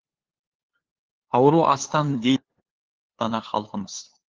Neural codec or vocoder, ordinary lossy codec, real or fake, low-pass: codec, 16 kHz, 2 kbps, FunCodec, trained on LibriTTS, 25 frames a second; Opus, 16 kbps; fake; 7.2 kHz